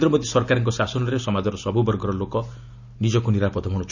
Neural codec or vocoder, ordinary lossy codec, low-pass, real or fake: none; none; 7.2 kHz; real